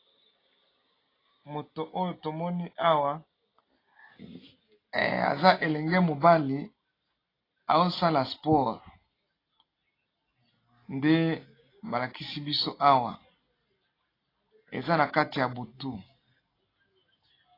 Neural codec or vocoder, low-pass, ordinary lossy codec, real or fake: none; 5.4 kHz; AAC, 24 kbps; real